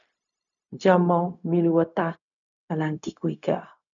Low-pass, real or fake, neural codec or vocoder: 7.2 kHz; fake; codec, 16 kHz, 0.4 kbps, LongCat-Audio-Codec